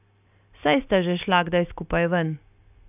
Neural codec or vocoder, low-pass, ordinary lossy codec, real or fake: none; 3.6 kHz; none; real